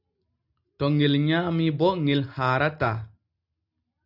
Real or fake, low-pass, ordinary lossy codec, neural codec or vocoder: real; 5.4 kHz; AAC, 48 kbps; none